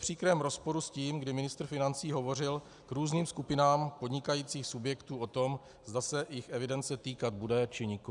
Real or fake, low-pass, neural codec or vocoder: real; 10.8 kHz; none